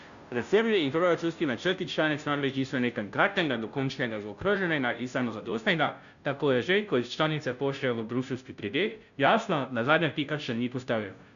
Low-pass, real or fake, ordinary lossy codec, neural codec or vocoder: 7.2 kHz; fake; none; codec, 16 kHz, 0.5 kbps, FunCodec, trained on Chinese and English, 25 frames a second